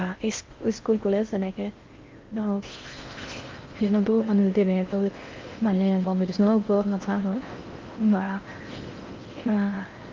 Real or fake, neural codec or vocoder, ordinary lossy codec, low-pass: fake; codec, 16 kHz in and 24 kHz out, 0.6 kbps, FocalCodec, streaming, 4096 codes; Opus, 32 kbps; 7.2 kHz